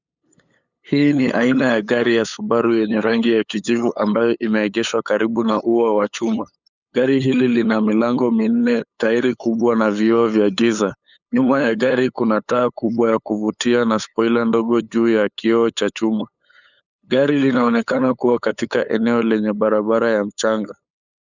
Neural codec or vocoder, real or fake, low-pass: codec, 16 kHz, 8 kbps, FunCodec, trained on LibriTTS, 25 frames a second; fake; 7.2 kHz